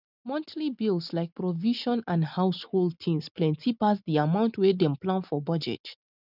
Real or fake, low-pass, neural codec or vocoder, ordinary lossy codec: real; 5.4 kHz; none; none